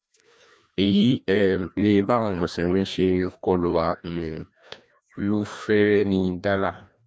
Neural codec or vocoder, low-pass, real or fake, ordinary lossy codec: codec, 16 kHz, 1 kbps, FreqCodec, larger model; none; fake; none